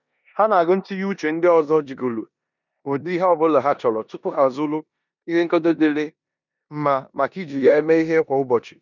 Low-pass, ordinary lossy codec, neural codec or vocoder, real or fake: 7.2 kHz; none; codec, 16 kHz in and 24 kHz out, 0.9 kbps, LongCat-Audio-Codec, four codebook decoder; fake